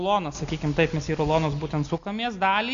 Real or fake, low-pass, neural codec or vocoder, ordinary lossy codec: real; 7.2 kHz; none; AAC, 64 kbps